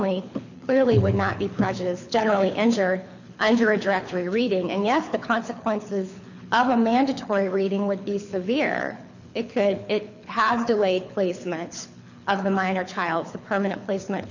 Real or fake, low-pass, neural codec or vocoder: fake; 7.2 kHz; codec, 24 kHz, 6 kbps, HILCodec